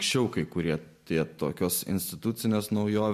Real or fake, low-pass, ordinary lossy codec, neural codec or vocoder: real; 14.4 kHz; MP3, 64 kbps; none